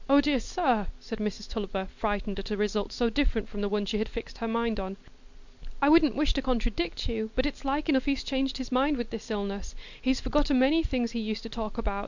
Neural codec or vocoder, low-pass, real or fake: none; 7.2 kHz; real